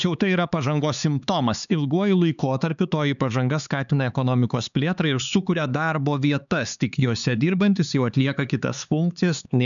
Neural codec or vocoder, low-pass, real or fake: codec, 16 kHz, 4 kbps, X-Codec, HuBERT features, trained on LibriSpeech; 7.2 kHz; fake